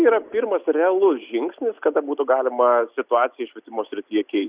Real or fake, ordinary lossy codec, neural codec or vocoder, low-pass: real; Opus, 64 kbps; none; 3.6 kHz